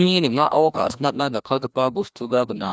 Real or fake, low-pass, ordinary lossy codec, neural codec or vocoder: fake; none; none; codec, 16 kHz, 1 kbps, FreqCodec, larger model